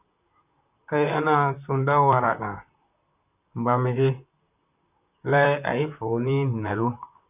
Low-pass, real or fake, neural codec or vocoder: 3.6 kHz; fake; vocoder, 44.1 kHz, 128 mel bands, Pupu-Vocoder